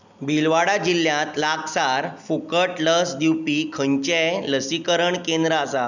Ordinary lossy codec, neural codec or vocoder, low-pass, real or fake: none; none; 7.2 kHz; real